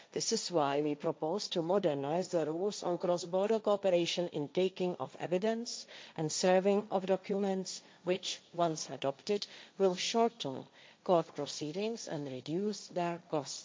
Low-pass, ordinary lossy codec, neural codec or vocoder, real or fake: none; none; codec, 16 kHz, 1.1 kbps, Voila-Tokenizer; fake